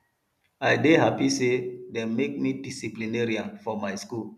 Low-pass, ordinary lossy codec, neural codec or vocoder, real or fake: 14.4 kHz; none; none; real